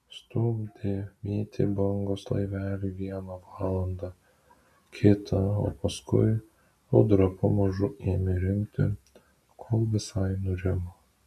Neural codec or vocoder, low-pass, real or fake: none; 14.4 kHz; real